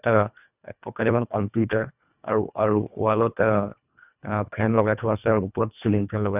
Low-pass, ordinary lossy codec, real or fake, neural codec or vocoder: 3.6 kHz; none; fake; codec, 24 kHz, 1.5 kbps, HILCodec